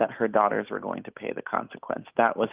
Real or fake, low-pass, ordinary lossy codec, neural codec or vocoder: real; 3.6 kHz; Opus, 24 kbps; none